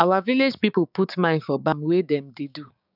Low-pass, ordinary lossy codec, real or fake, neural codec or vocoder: 5.4 kHz; none; fake; codec, 16 kHz, 6 kbps, DAC